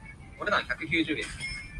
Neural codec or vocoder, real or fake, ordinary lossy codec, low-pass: none; real; Opus, 32 kbps; 10.8 kHz